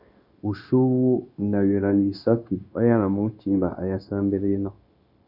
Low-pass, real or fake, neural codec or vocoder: 5.4 kHz; fake; codec, 16 kHz, 0.9 kbps, LongCat-Audio-Codec